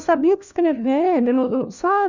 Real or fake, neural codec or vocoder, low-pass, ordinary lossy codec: fake; codec, 16 kHz, 1 kbps, FunCodec, trained on LibriTTS, 50 frames a second; 7.2 kHz; none